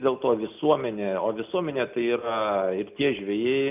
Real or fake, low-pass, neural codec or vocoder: real; 3.6 kHz; none